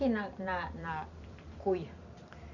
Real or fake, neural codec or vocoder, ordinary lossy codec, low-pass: fake; vocoder, 44.1 kHz, 128 mel bands every 256 samples, BigVGAN v2; none; 7.2 kHz